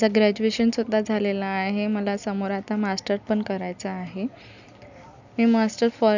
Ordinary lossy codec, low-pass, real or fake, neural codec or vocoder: none; 7.2 kHz; real; none